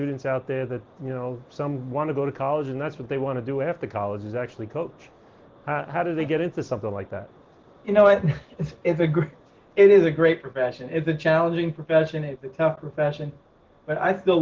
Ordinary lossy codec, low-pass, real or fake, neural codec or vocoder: Opus, 16 kbps; 7.2 kHz; real; none